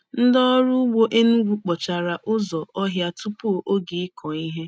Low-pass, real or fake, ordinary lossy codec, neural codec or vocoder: none; real; none; none